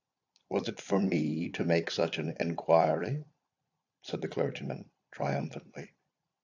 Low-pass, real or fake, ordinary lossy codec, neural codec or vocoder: 7.2 kHz; fake; AAC, 48 kbps; vocoder, 22.05 kHz, 80 mel bands, Vocos